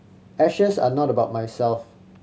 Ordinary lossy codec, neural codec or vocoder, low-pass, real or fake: none; none; none; real